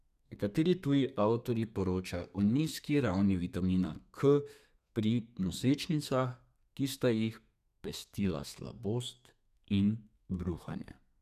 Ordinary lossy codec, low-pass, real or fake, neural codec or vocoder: none; 14.4 kHz; fake; codec, 32 kHz, 1.9 kbps, SNAC